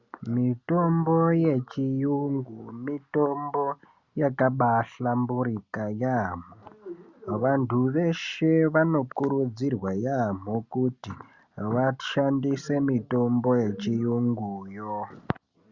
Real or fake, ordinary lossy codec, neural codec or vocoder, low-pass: real; Opus, 64 kbps; none; 7.2 kHz